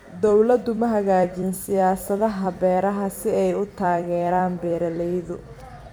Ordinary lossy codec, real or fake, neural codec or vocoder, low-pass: none; fake; vocoder, 44.1 kHz, 128 mel bands every 256 samples, BigVGAN v2; none